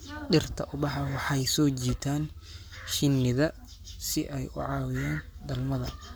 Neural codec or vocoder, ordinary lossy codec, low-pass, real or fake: codec, 44.1 kHz, 7.8 kbps, Pupu-Codec; none; none; fake